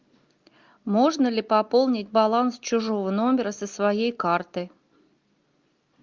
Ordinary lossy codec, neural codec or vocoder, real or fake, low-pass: Opus, 24 kbps; none; real; 7.2 kHz